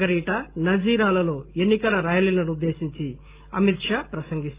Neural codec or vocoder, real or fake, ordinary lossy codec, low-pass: none; real; Opus, 24 kbps; 3.6 kHz